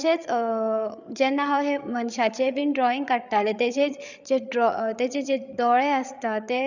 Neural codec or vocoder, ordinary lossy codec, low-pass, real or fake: codec, 16 kHz, 8 kbps, FreqCodec, larger model; none; 7.2 kHz; fake